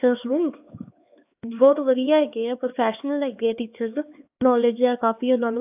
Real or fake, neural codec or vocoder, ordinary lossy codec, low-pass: fake; codec, 16 kHz, 4 kbps, X-Codec, HuBERT features, trained on LibriSpeech; AAC, 32 kbps; 3.6 kHz